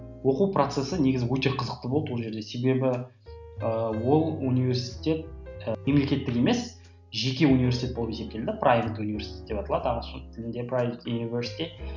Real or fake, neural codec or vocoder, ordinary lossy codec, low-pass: real; none; none; 7.2 kHz